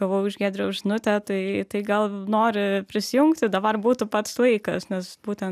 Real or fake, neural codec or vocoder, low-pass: real; none; 14.4 kHz